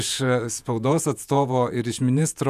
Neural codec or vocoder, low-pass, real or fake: vocoder, 48 kHz, 128 mel bands, Vocos; 14.4 kHz; fake